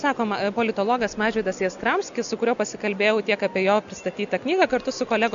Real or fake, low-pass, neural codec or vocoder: real; 7.2 kHz; none